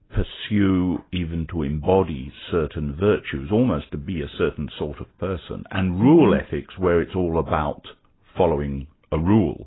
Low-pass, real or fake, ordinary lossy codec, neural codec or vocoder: 7.2 kHz; real; AAC, 16 kbps; none